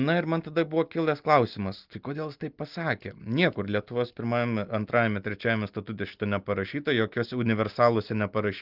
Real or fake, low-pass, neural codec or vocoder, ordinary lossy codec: real; 5.4 kHz; none; Opus, 32 kbps